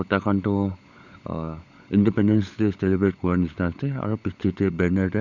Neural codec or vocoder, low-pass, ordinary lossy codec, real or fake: codec, 16 kHz, 16 kbps, FunCodec, trained on LibriTTS, 50 frames a second; 7.2 kHz; none; fake